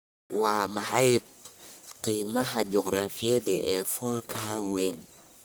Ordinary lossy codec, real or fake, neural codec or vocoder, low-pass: none; fake; codec, 44.1 kHz, 1.7 kbps, Pupu-Codec; none